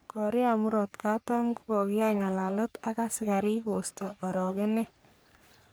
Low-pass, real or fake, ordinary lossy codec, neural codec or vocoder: none; fake; none; codec, 44.1 kHz, 3.4 kbps, Pupu-Codec